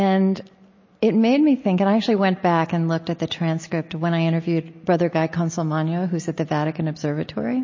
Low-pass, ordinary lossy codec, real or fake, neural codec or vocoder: 7.2 kHz; MP3, 32 kbps; real; none